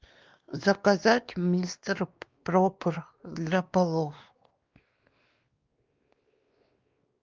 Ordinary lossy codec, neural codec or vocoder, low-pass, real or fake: Opus, 32 kbps; codec, 16 kHz, 2 kbps, X-Codec, WavLM features, trained on Multilingual LibriSpeech; 7.2 kHz; fake